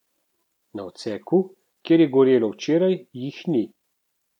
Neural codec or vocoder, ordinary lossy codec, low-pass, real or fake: none; none; 19.8 kHz; real